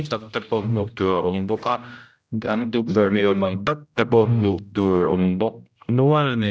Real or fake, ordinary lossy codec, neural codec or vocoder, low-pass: fake; none; codec, 16 kHz, 0.5 kbps, X-Codec, HuBERT features, trained on general audio; none